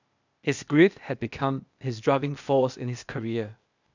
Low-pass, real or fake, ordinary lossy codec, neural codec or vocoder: 7.2 kHz; fake; none; codec, 16 kHz, 0.8 kbps, ZipCodec